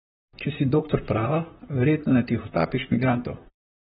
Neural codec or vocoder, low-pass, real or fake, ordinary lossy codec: vocoder, 44.1 kHz, 128 mel bands, Pupu-Vocoder; 19.8 kHz; fake; AAC, 16 kbps